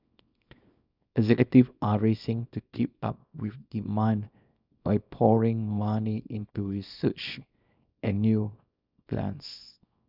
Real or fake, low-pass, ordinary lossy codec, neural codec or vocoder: fake; 5.4 kHz; none; codec, 24 kHz, 0.9 kbps, WavTokenizer, small release